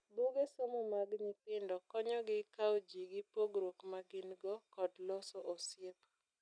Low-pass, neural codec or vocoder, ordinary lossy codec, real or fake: 9.9 kHz; none; none; real